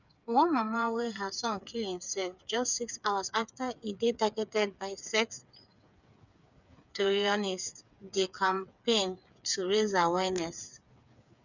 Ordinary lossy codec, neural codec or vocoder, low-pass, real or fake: none; codec, 16 kHz, 8 kbps, FreqCodec, smaller model; 7.2 kHz; fake